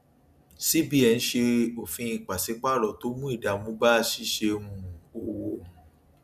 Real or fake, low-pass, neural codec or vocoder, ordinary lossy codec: real; 14.4 kHz; none; none